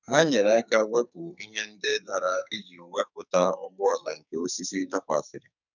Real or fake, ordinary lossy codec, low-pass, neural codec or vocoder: fake; none; 7.2 kHz; codec, 44.1 kHz, 2.6 kbps, SNAC